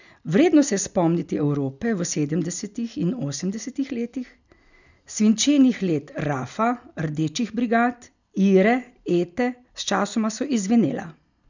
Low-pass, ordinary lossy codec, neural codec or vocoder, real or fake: 7.2 kHz; none; none; real